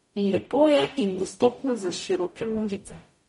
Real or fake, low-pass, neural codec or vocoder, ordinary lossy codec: fake; 19.8 kHz; codec, 44.1 kHz, 0.9 kbps, DAC; MP3, 48 kbps